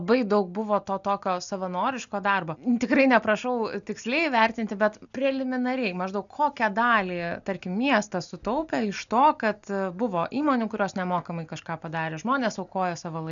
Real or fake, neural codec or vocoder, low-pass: real; none; 7.2 kHz